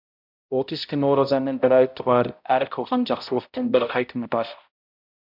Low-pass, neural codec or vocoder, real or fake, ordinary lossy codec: 5.4 kHz; codec, 16 kHz, 0.5 kbps, X-Codec, HuBERT features, trained on balanced general audio; fake; MP3, 48 kbps